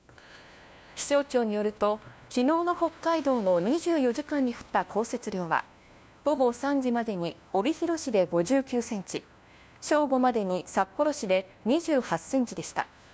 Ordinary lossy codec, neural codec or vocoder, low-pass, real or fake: none; codec, 16 kHz, 1 kbps, FunCodec, trained on LibriTTS, 50 frames a second; none; fake